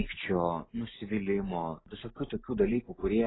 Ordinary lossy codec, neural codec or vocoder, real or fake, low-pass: AAC, 16 kbps; none; real; 7.2 kHz